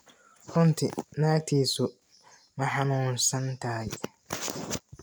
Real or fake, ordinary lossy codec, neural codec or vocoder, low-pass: real; none; none; none